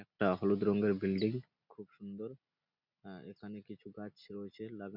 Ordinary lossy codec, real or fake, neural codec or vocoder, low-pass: none; real; none; 5.4 kHz